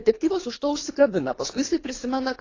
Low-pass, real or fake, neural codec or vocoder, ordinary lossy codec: 7.2 kHz; fake; codec, 24 kHz, 3 kbps, HILCodec; AAC, 32 kbps